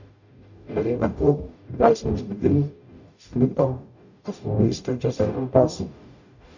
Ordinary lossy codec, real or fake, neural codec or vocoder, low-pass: none; fake; codec, 44.1 kHz, 0.9 kbps, DAC; 7.2 kHz